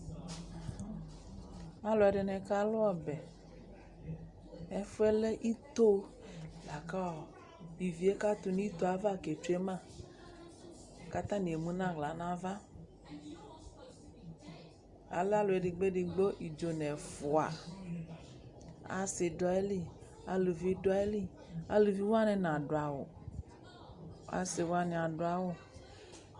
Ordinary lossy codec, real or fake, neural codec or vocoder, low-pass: Opus, 64 kbps; real; none; 10.8 kHz